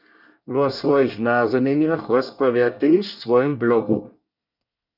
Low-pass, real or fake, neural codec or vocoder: 5.4 kHz; fake; codec, 24 kHz, 1 kbps, SNAC